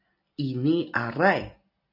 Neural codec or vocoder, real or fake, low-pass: none; real; 5.4 kHz